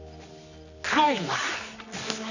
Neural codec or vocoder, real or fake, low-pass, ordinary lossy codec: codec, 24 kHz, 0.9 kbps, WavTokenizer, medium music audio release; fake; 7.2 kHz; none